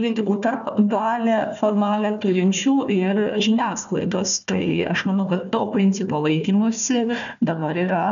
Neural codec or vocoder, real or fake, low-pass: codec, 16 kHz, 1 kbps, FunCodec, trained on Chinese and English, 50 frames a second; fake; 7.2 kHz